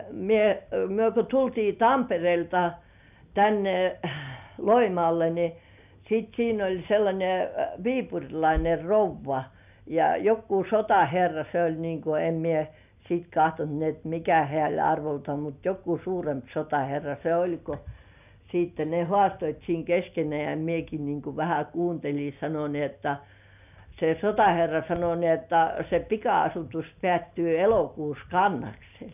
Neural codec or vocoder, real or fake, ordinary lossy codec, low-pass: none; real; none; 3.6 kHz